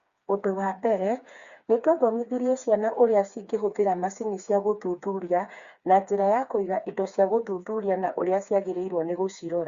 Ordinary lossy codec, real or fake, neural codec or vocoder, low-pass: Opus, 64 kbps; fake; codec, 16 kHz, 4 kbps, FreqCodec, smaller model; 7.2 kHz